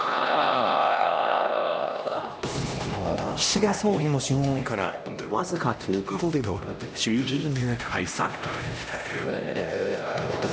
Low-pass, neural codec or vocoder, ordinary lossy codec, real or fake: none; codec, 16 kHz, 1 kbps, X-Codec, HuBERT features, trained on LibriSpeech; none; fake